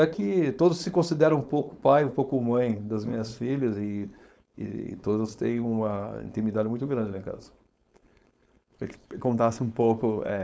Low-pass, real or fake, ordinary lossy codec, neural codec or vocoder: none; fake; none; codec, 16 kHz, 4.8 kbps, FACodec